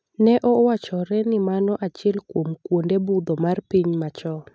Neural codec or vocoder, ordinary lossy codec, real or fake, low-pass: none; none; real; none